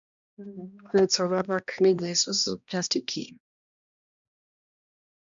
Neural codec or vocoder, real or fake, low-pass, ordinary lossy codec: codec, 16 kHz, 1 kbps, X-Codec, HuBERT features, trained on balanced general audio; fake; 7.2 kHz; MP3, 64 kbps